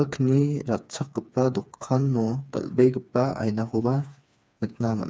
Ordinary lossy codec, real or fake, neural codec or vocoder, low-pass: none; fake; codec, 16 kHz, 4 kbps, FreqCodec, smaller model; none